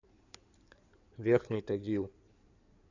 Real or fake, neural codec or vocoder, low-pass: fake; codec, 16 kHz in and 24 kHz out, 2.2 kbps, FireRedTTS-2 codec; 7.2 kHz